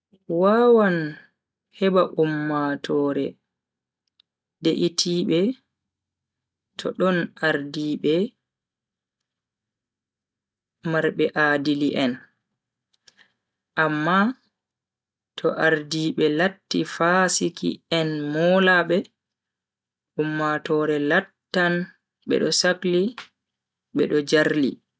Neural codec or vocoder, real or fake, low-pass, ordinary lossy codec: none; real; none; none